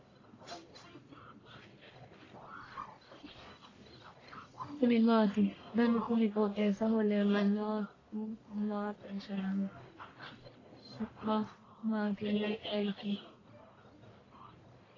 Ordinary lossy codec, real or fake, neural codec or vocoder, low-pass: AAC, 32 kbps; fake; codec, 44.1 kHz, 1.7 kbps, Pupu-Codec; 7.2 kHz